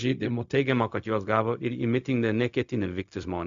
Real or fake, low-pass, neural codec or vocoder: fake; 7.2 kHz; codec, 16 kHz, 0.4 kbps, LongCat-Audio-Codec